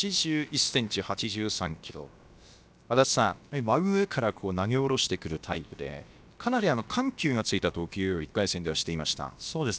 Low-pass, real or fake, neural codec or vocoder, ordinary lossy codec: none; fake; codec, 16 kHz, about 1 kbps, DyCAST, with the encoder's durations; none